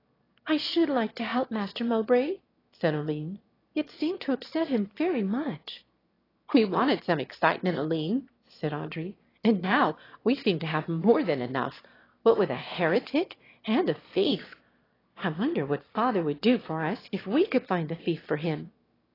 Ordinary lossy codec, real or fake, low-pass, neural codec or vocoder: AAC, 24 kbps; fake; 5.4 kHz; autoencoder, 22.05 kHz, a latent of 192 numbers a frame, VITS, trained on one speaker